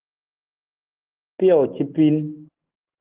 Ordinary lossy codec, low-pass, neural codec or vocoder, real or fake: Opus, 16 kbps; 3.6 kHz; none; real